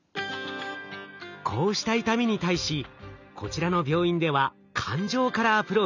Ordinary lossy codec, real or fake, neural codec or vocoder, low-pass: none; real; none; 7.2 kHz